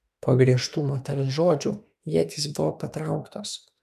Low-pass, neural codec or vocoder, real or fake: 14.4 kHz; autoencoder, 48 kHz, 32 numbers a frame, DAC-VAE, trained on Japanese speech; fake